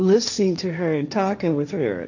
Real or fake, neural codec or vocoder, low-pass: fake; codec, 16 kHz, 1.1 kbps, Voila-Tokenizer; 7.2 kHz